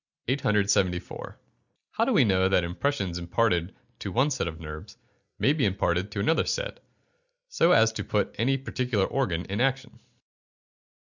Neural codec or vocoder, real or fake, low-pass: none; real; 7.2 kHz